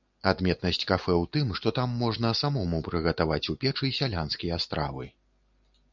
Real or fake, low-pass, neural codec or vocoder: real; 7.2 kHz; none